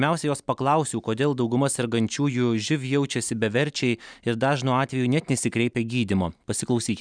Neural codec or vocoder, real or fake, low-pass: none; real; 9.9 kHz